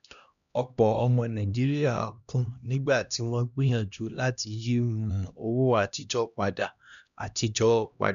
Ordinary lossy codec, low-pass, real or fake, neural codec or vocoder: none; 7.2 kHz; fake; codec, 16 kHz, 1 kbps, X-Codec, HuBERT features, trained on LibriSpeech